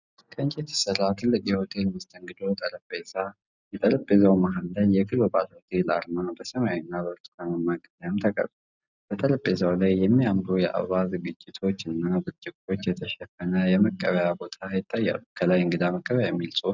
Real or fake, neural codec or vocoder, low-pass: real; none; 7.2 kHz